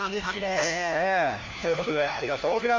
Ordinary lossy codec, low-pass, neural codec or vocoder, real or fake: MP3, 64 kbps; 7.2 kHz; codec, 16 kHz, 1 kbps, FunCodec, trained on LibriTTS, 50 frames a second; fake